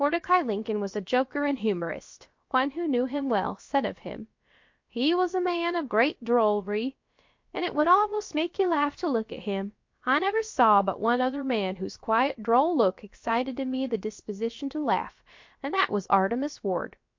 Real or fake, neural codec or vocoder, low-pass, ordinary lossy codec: fake; codec, 16 kHz, about 1 kbps, DyCAST, with the encoder's durations; 7.2 kHz; MP3, 48 kbps